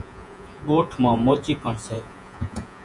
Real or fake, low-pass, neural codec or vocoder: fake; 10.8 kHz; vocoder, 48 kHz, 128 mel bands, Vocos